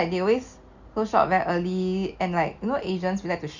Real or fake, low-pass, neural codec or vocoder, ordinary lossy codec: real; 7.2 kHz; none; Opus, 64 kbps